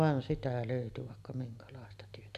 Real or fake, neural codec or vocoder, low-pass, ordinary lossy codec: real; none; 14.4 kHz; none